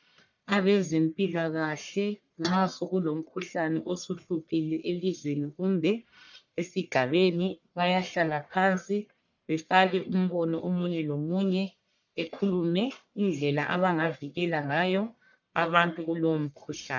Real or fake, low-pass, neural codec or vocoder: fake; 7.2 kHz; codec, 44.1 kHz, 1.7 kbps, Pupu-Codec